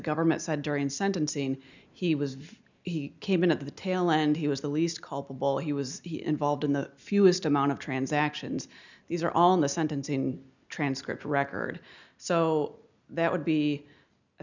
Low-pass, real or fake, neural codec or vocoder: 7.2 kHz; real; none